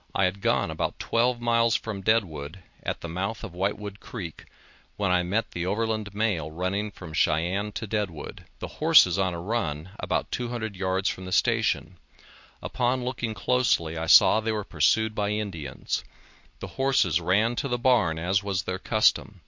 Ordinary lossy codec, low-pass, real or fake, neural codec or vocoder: MP3, 48 kbps; 7.2 kHz; real; none